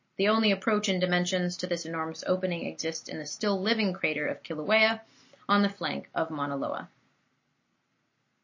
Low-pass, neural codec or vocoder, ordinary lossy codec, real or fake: 7.2 kHz; none; MP3, 32 kbps; real